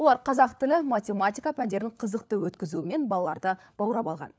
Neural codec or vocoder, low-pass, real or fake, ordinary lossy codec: codec, 16 kHz, 4 kbps, FunCodec, trained on LibriTTS, 50 frames a second; none; fake; none